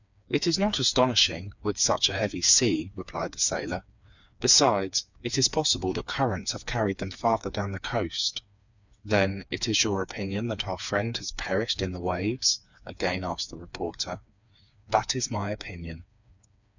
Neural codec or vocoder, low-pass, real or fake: codec, 16 kHz, 4 kbps, FreqCodec, smaller model; 7.2 kHz; fake